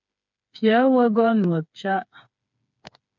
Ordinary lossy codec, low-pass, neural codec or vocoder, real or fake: MP3, 64 kbps; 7.2 kHz; codec, 16 kHz, 4 kbps, FreqCodec, smaller model; fake